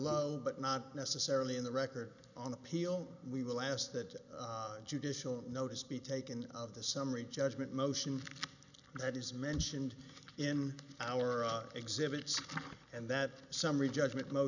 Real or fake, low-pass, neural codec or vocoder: real; 7.2 kHz; none